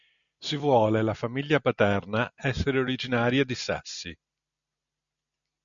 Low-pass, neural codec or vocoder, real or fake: 7.2 kHz; none; real